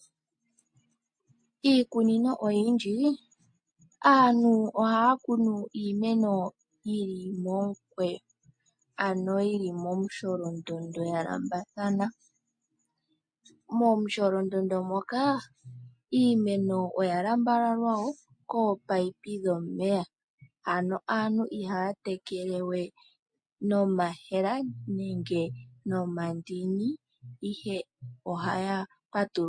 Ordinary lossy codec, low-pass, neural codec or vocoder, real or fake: MP3, 48 kbps; 9.9 kHz; none; real